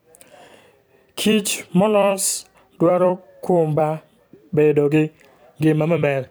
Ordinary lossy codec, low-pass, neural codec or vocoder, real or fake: none; none; vocoder, 44.1 kHz, 128 mel bands every 256 samples, BigVGAN v2; fake